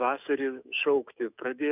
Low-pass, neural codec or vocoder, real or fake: 3.6 kHz; autoencoder, 48 kHz, 128 numbers a frame, DAC-VAE, trained on Japanese speech; fake